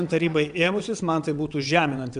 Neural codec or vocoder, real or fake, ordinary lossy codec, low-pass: vocoder, 22.05 kHz, 80 mel bands, WaveNeXt; fake; MP3, 96 kbps; 9.9 kHz